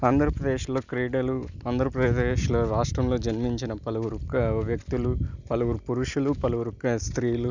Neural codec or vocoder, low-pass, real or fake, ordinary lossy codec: none; 7.2 kHz; real; none